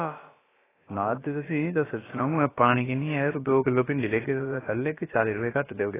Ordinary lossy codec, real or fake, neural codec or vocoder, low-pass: AAC, 16 kbps; fake; codec, 16 kHz, about 1 kbps, DyCAST, with the encoder's durations; 3.6 kHz